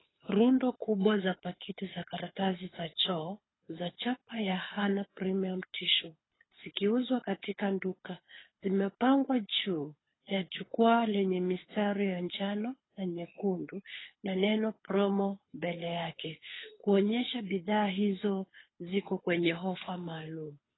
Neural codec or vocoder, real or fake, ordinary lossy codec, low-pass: codec, 24 kHz, 6 kbps, HILCodec; fake; AAC, 16 kbps; 7.2 kHz